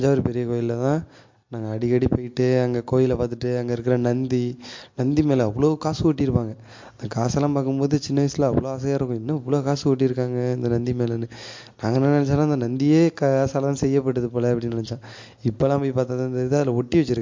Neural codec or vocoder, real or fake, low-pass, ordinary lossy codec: none; real; 7.2 kHz; MP3, 48 kbps